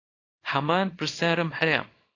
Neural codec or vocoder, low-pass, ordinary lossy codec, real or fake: codec, 24 kHz, 0.9 kbps, WavTokenizer, small release; 7.2 kHz; AAC, 32 kbps; fake